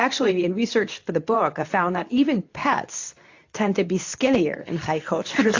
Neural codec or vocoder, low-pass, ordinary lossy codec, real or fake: codec, 24 kHz, 0.9 kbps, WavTokenizer, medium speech release version 2; 7.2 kHz; AAC, 48 kbps; fake